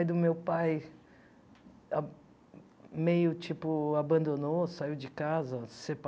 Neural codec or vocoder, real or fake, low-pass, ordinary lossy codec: none; real; none; none